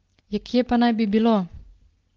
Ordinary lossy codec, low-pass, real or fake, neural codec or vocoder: Opus, 16 kbps; 7.2 kHz; real; none